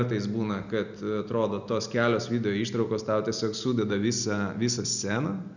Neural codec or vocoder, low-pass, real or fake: none; 7.2 kHz; real